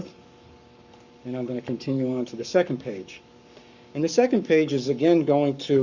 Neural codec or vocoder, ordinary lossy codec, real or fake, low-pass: codec, 44.1 kHz, 7.8 kbps, Pupu-Codec; Opus, 64 kbps; fake; 7.2 kHz